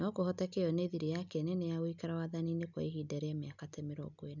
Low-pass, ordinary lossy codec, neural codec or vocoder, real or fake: 7.2 kHz; none; none; real